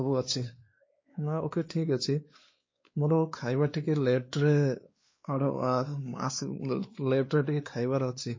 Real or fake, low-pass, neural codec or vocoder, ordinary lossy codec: fake; 7.2 kHz; codec, 16 kHz, 2 kbps, X-Codec, WavLM features, trained on Multilingual LibriSpeech; MP3, 32 kbps